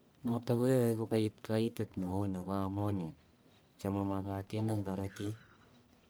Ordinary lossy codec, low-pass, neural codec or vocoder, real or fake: none; none; codec, 44.1 kHz, 1.7 kbps, Pupu-Codec; fake